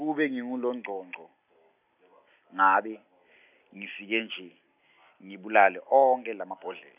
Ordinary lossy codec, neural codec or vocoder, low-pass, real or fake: none; none; 3.6 kHz; real